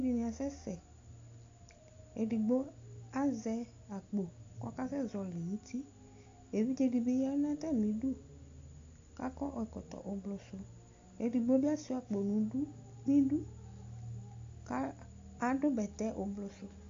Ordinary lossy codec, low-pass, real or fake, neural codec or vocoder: AAC, 48 kbps; 7.2 kHz; real; none